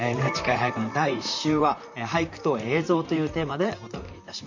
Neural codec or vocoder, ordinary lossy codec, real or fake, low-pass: vocoder, 44.1 kHz, 128 mel bands, Pupu-Vocoder; none; fake; 7.2 kHz